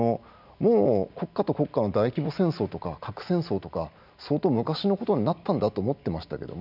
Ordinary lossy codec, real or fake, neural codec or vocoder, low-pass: none; real; none; 5.4 kHz